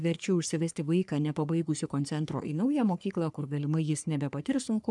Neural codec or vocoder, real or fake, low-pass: codec, 44.1 kHz, 3.4 kbps, Pupu-Codec; fake; 10.8 kHz